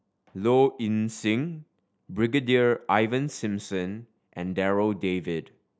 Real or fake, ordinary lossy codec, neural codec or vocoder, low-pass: real; none; none; none